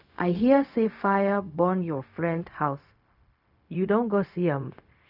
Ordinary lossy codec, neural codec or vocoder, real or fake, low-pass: none; codec, 16 kHz, 0.4 kbps, LongCat-Audio-Codec; fake; 5.4 kHz